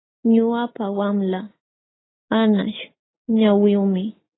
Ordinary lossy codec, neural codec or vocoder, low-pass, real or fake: AAC, 16 kbps; none; 7.2 kHz; real